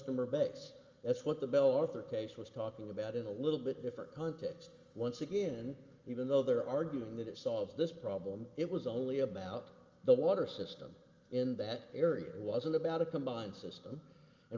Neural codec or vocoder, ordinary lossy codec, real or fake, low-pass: none; Opus, 32 kbps; real; 7.2 kHz